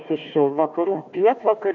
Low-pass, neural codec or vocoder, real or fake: 7.2 kHz; codec, 16 kHz, 1 kbps, FunCodec, trained on Chinese and English, 50 frames a second; fake